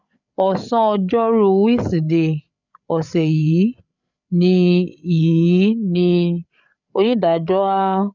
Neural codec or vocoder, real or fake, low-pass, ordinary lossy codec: codec, 16 kHz, 8 kbps, FreqCodec, larger model; fake; 7.2 kHz; none